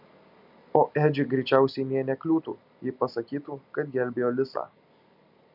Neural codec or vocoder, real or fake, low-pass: none; real; 5.4 kHz